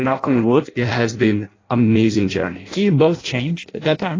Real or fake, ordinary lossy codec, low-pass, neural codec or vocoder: fake; AAC, 32 kbps; 7.2 kHz; codec, 16 kHz in and 24 kHz out, 0.6 kbps, FireRedTTS-2 codec